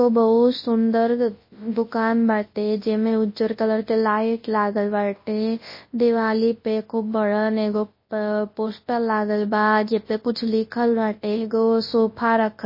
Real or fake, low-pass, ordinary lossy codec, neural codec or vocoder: fake; 5.4 kHz; MP3, 24 kbps; codec, 24 kHz, 0.9 kbps, WavTokenizer, large speech release